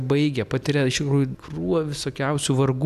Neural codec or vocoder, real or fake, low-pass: none; real; 14.4 kHz